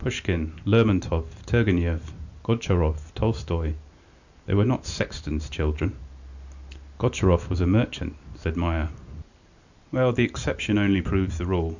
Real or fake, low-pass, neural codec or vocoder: real; 7.2 kHz; none